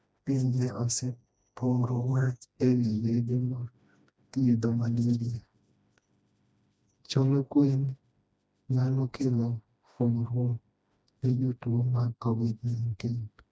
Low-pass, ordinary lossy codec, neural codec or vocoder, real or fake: none; none; codec, 16 kHz, 1 kbps, FreqCodec, smaller model; fake